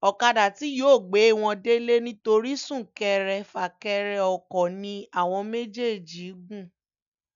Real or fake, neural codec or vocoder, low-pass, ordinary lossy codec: real; none; 7.2 kHz; none